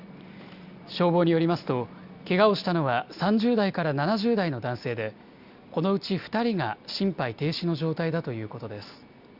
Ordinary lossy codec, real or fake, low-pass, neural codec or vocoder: Opus, 64 kbps; real; 5.4 kHz; none